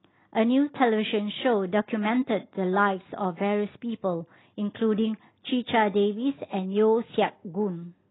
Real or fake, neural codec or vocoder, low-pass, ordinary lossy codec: real; none; 7.2 kHz; AAC, 16 kbps